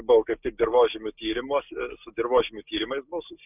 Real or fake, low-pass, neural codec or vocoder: real; 3.6 kHz; none